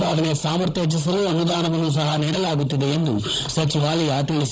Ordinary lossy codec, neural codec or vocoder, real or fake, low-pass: none; codec, 16 kHz, 16 kbps, FunCodec, trained on Chinese and English, 50 frames a second; fake; none